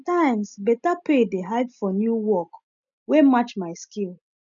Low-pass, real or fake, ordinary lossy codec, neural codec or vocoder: 7.2 kHz; real; none; none